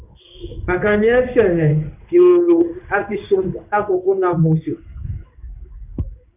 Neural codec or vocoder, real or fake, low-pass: codec, 16 kHz in and 24 kHz out, 1 kbps, XY-Tokenizer; fake; 3.6 kHz